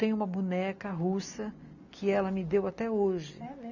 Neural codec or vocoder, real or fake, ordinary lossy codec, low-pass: none; real; none; 7.2 kHz